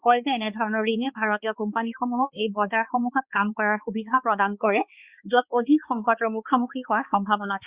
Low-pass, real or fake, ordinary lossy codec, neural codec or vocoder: 3.6 kHz; fake; Opus, 64 kbps; codec, 16 kHz, 2 kbps, X-Codec, HuBERT features, trained on balanced general audio